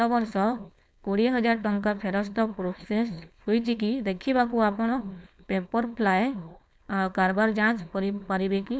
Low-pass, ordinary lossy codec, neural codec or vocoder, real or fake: none; none; codec, 16 kHz, 4.8 kbps, FACodec; fake